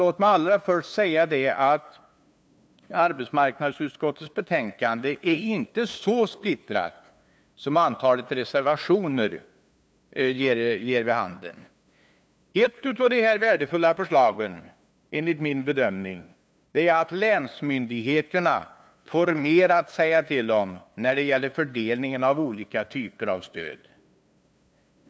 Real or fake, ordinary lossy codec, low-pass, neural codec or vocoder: fake; none; none; codec, 16 kHz, 2 kbps, FunCodec, trained on LibriTTS, 25 frames a second